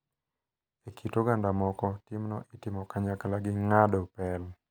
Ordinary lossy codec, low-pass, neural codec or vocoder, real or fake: none; none; none; real